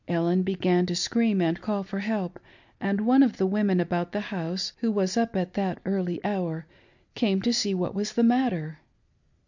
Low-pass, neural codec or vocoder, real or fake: 7.2 kHz; none; real